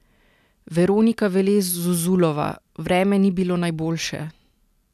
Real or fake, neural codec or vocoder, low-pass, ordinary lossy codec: real; none; 14.4 kHz; none